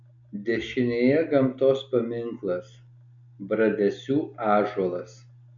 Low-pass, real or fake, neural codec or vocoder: 7.2 kHz; real; none